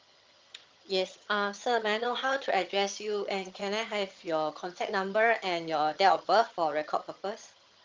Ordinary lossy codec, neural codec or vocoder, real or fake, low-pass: Opus, 24 kbps; vocoder, 22.05 kHz, 80 mel bands, HiFi-GAN; fake; 7.2 kHz